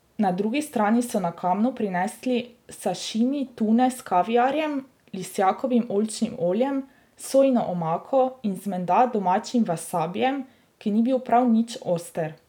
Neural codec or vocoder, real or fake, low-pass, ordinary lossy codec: none; real; 19.8 kHz; none